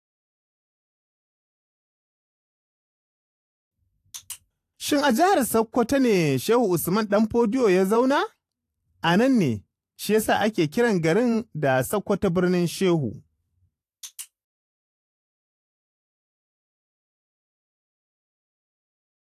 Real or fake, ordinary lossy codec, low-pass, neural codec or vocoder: fake; AAC, 64 kbps; 14.4 kHz; vocoder, 44.1 kHz, 128 mel bands every 512 samples, BigVGAN v2